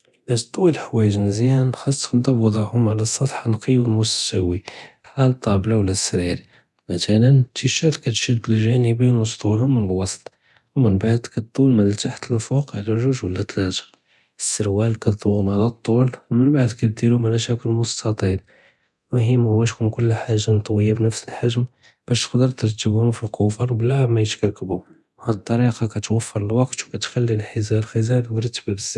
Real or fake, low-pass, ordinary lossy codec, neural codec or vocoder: fake; none; none; codec, 24 kHz, 0.9 kbps, DualCodec